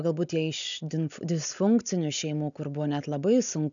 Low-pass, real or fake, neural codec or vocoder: 7.2 kHz; real; none